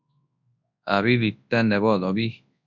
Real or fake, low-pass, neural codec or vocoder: fake; 7.2 kHz; codec, 24 kHz, 0.9 kbps, WavTokenizer, large speech release